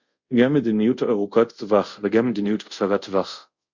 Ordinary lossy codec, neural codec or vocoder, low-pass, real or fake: Opus, 64 kbps; codec, 24 kHz, 0.5 kbps, DualCodec; 7.2 kHz; fake